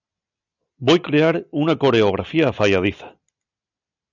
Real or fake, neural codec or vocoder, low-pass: real; none; 7.2 kHz